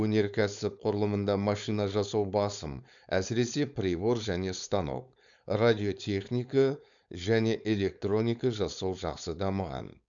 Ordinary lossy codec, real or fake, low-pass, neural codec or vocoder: none; fake; 7.2 kHz; codec, 16 kHz, 4.8 kbps, FACodec